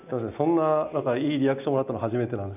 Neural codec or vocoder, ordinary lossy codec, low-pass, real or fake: none; none; 3.6 kHz; real